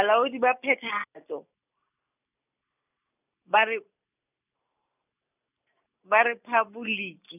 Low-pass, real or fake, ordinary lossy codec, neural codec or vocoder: 3.6 kHz; real; none; none